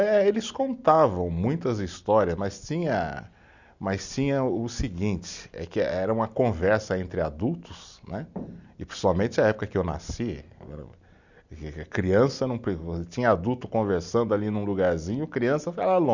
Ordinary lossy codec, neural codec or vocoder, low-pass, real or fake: none; none; 7.2 kHz; real